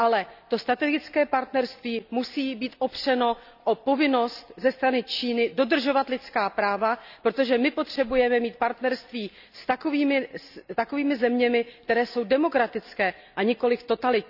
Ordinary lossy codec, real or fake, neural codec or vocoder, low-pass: none; real; none; 5.4 kHz